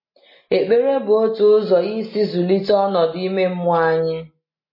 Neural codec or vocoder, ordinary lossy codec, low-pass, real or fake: none; MP3, 24 kbps; 5.4 kHz; real